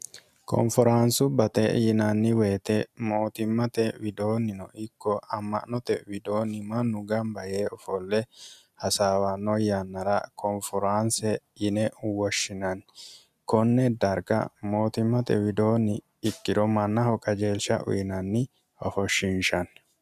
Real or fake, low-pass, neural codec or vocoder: real; 14.4 kHz; none